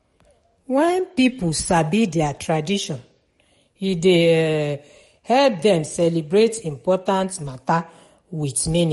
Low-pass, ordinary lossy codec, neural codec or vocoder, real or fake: 19.8 kHz; MP3, 48 kbps; codec, 44.1 kHz, 7.8 kbps, DAC; fake